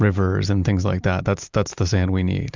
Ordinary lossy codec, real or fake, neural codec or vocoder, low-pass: Opus, 64 kbps; real; none; 7.2 kHz